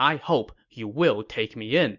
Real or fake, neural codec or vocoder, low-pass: real; none; 7.2 kHz